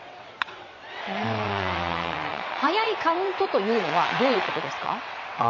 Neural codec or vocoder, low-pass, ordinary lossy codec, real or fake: vocoder, 22.05 kHz, 80 mel bands, WaveNeXt; 7.2 kHz; MP3, 32 kbps; fake